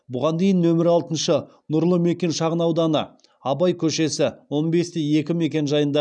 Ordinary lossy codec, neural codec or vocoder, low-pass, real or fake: none; none; 9.9 kHz; real